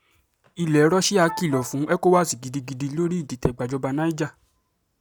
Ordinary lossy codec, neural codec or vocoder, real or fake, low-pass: none; none; real; none